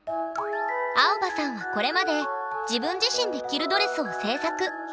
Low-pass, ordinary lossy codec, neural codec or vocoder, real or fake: none; none; none; real